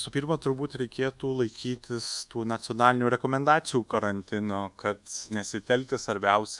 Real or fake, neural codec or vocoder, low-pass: fake; codec, 24 kHz, 1.2 kbps, DualCodec; 10.8 kHz